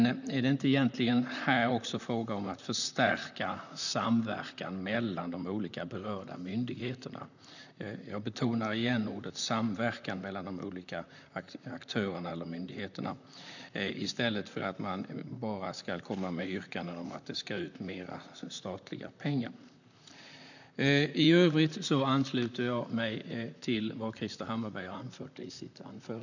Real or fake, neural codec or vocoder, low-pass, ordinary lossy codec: fake; vocoder, 44.1 kHz, 128 mel bands, Pupu-Vocoder; 7.2 kHz; none